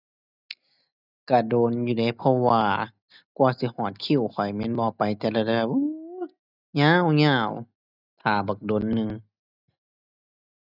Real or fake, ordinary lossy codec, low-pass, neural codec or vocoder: real; none; 5.4 kHz; none